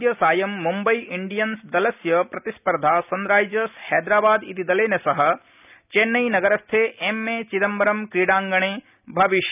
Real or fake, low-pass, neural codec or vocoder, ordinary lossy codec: real; 3.6 kHz; none; none